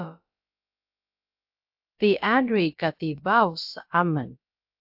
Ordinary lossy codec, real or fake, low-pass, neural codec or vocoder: AAC, 48 kbps; fake; 5.4 kHz; codec, 16 kHz, about 1 kbps, DyCAST, with the encoder's durations